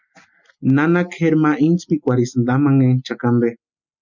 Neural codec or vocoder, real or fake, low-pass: none; real; 7.2 kHz